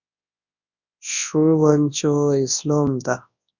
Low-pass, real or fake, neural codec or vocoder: 7.2 kHz; fake; codec, 24 kHz, 0.9 kbps, WavTokenizer, large speech release